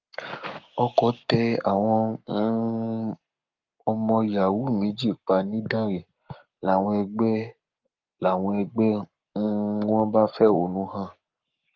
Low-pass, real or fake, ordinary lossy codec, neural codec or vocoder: 7.2 kHz; fake; Opus, 32 kbps; codec, 44.1 kHz, 7.8 kbps, Pupu-Codec